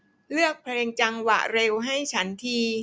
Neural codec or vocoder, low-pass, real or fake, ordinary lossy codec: none; none; real; none